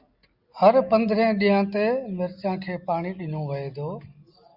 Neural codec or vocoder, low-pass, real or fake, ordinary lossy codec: none; 5.4 kHz; real; Opus, 64 kbps